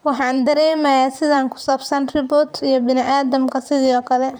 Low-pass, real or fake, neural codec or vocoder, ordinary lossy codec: none; fake; vocoder, 44.1 kHz, 128 mel bands, Pupu-Vocoder; none